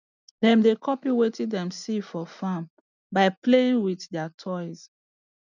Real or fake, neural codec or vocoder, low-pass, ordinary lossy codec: real; none; 7.2 kHz; none